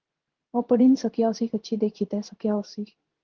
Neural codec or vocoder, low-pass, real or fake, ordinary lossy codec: codec, 16 kHz in and 24 kHz out, 1 kbps, XY-Tokenizer; 7.2 kHz; fake; Opus, 16 kbps